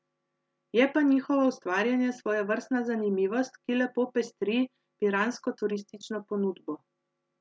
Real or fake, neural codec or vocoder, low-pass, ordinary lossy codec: real; none; none; none